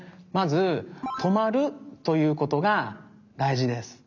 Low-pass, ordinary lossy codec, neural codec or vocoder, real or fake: 7.2 kHz; none; none; real